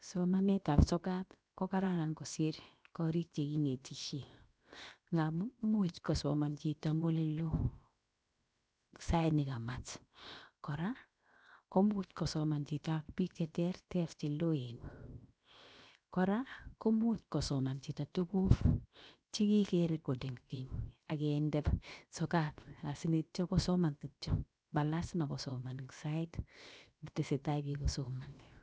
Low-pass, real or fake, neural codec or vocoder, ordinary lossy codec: none; fake; codec, 16 kHz, 0.7 kbps, FocalCodec; none